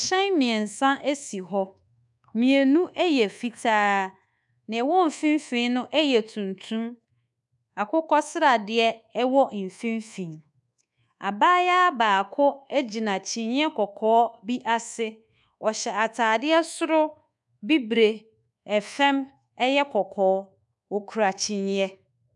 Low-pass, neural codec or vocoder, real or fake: 10.8 kHz; codec, 24 kHz, 1.2 kbps, DualCodec; fake